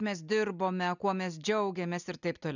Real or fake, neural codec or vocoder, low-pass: real; none; 7.2 kHz